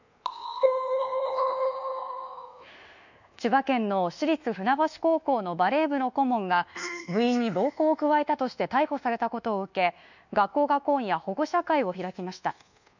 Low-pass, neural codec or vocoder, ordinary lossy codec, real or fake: 7.2 kHz; codec, 24 kHz, 1.2 kbps, DualCodec; none; fake